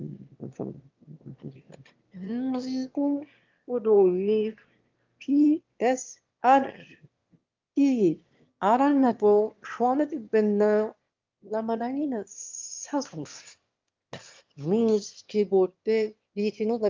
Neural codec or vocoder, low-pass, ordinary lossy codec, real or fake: autoencoder, 22.05 kHz, a latent of 192 numbers a frame, VITS, trained on one speaker; 7.2 kHz; Opus, 24 kbps; fake